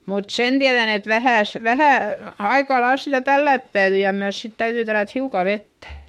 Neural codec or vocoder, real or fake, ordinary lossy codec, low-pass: autoencoder, 48 kHz, 32 numbers a frame, DAC-VAE, trained on Japanese speech; fake; MP3, 64 kbps; 19.8 kHz